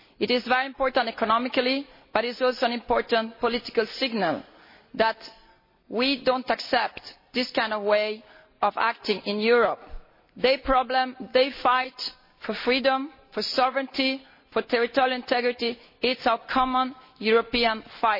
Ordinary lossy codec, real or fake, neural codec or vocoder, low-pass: MP3, 24 kbps; real; none; 5.4 kHz